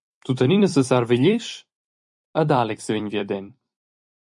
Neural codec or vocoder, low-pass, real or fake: vocoder, 44.1 kHz, 128 mel bands every 256 samples, BigVGAN v2; 10.8 kHz; fake